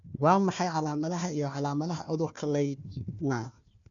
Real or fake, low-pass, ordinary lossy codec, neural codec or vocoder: fake; 7.2 kHz; none; codec, 16 kHz, 1 kbps, FunCodec, trained on Chinese and English, 50 frames a second